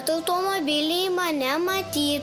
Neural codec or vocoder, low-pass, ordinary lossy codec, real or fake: none; 14.4 kHz; Opus, 32 kbps; real